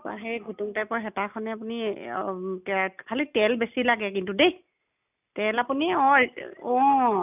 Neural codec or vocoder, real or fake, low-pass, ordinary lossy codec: none; real; 3.6 kHz; none